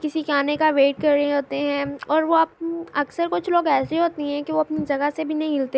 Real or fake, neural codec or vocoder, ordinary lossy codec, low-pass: real; none; none; none